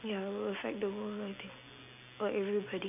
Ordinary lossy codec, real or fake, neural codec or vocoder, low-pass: none; real; none; 3.6 kHz